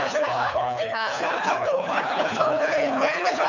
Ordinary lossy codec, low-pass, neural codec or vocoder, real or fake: none; 7.2 kHz; codec, 24 kHz, 3 kbps, HILCodec; fake